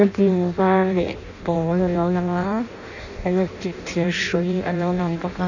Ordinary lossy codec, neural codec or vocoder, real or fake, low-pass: none; codec, 16 kHz in and 24 kHz out, 0.6 kbps, FireRedTTS-2 codec; fake; 7.2 kHz